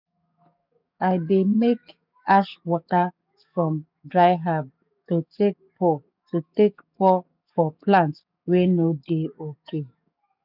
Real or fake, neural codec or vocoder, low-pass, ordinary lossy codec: fake; codec, 44.1 kHz, 7.8 kbps, DAC; 5.4 kHz; none